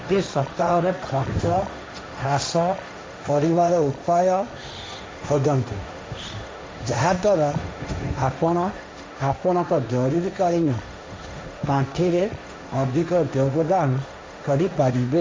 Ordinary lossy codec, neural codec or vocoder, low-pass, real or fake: none; codec, 16 kHz, 1.1 kbps, Voila-Tokenizer; none; fake